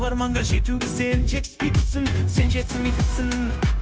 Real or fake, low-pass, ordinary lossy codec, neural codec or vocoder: fake; none; none; codec, 16 kHz, 0.9 kbps, LongCat-Audio-Codec